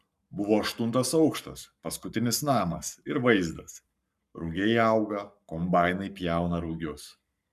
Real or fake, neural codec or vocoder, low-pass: fake; codec, 44.1 kHz, 7.8 kbps, Pupu-Codec; 14.4 kHz